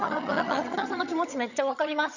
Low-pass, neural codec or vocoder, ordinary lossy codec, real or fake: 7.2 kHz; vocoder, 22.05 kHz, 80 mel bands, HiFi-GAN; none; fake